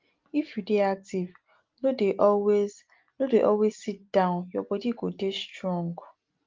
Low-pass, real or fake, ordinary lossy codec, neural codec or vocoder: 7.2 kHz; real; Opus, 32 kbps; none